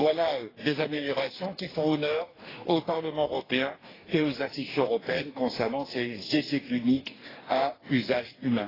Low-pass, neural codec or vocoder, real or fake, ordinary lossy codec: 5.4 kHz; codec, 44.1 kHz, 2.6 kbps, DAC; fake; AAC, 24 kbps